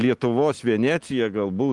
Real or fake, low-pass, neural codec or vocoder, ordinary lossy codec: real; 10.8 kHz; none; Opus, 24 kbps